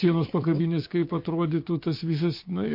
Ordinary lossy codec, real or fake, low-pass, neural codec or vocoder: MP3, 32 kbps; fake; 5.4 kHz; codec, 16 kHz, 6 kbps, DAC